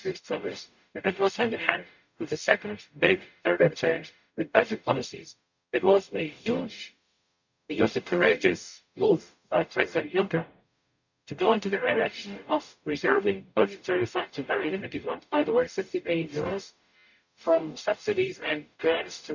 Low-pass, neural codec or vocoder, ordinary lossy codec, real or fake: 7.2 kHz; codec, 44.1 kHz, 0.9 kbps, DAC; none; fake